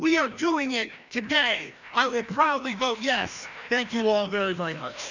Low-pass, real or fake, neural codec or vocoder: 7.2 kHz; fake; codec, 16 kHz, 1 kbps, FreqCodec, larger model